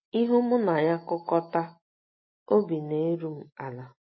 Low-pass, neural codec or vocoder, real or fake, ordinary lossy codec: 7.2 kHz; none; real; MP3, 24 kbps